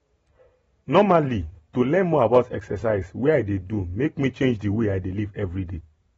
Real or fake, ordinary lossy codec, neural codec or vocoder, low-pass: real; AAC, 24 kbps; none; 19.8 kHz